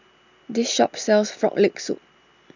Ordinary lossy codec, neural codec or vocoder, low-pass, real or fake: none; none; 7.2 kHz; real